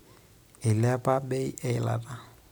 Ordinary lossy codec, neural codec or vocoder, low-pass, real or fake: none; none; none; real